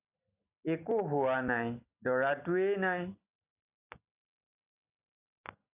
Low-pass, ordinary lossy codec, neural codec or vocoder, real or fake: 3.6 kHz; AAC, 32 kbps; none; real